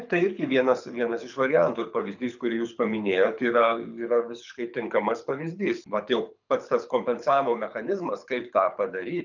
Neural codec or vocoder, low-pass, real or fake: codec, 24 kHz, 6 kbps, HILCodec; 7.2 kHz; fake